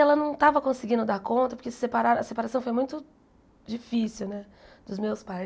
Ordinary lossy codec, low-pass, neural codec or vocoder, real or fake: none; none; none; real